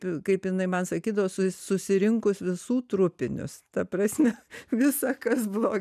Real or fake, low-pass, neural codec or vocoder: real; 14.4 kHz; none